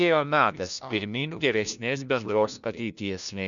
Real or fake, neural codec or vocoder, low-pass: fake; codec, 16 kHz, 1 kbps, FunCodec, trained on LibriTTS, 50 frames a second; 7.2 kHz